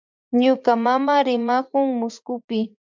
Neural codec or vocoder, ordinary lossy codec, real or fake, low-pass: vocoder, 44.1 kHz, 80 mel bands, Vocos; MP3, 64 kbps; fake; 7.2 kHz